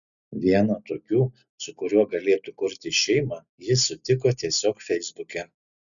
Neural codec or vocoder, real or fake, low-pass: none; real; 7.2 kHz